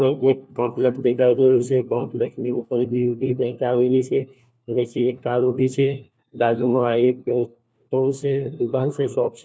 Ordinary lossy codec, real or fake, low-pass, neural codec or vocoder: none; fake; none; codec, 16 kHz, 1 kbps, FunCodec, trained on LibriTTS, 50 frames a second